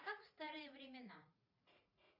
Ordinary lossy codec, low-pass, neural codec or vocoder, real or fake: Opus, 64 kbps; 5.4 kHz; vocoder, 44.1 kHz, 80 mel bands, Vocos; fake